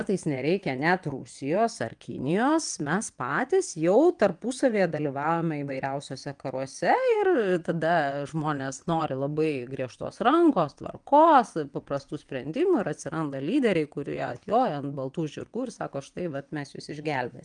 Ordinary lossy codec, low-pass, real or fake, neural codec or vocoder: Opus, 32 kbps; 9.9 kHz; fake; vocoder, 22.05 kHz, 80 mel bands, WaveNeXt